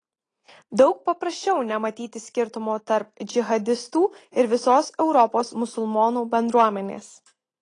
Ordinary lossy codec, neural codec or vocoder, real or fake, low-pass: AAC, 32 kbps; none; real; 9.9 kHz